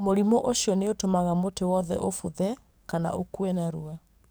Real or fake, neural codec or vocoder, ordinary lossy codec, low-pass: fake; codec, 44.1 kHz, 7.8 kbps, DAC; none; none